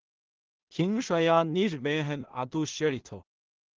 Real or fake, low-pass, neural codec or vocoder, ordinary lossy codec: fake; 7.2 kHz; codec, 16 kHz in and 24 kHz out, 0.4 kbps, LongCat-Audio-Codec, two codebook decoder; Opus, 16 kbps